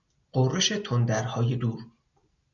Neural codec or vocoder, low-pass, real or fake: none; 7.2 kHz; real